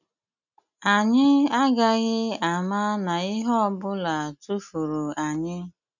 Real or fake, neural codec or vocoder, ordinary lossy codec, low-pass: real; none; none; 7.2 kHz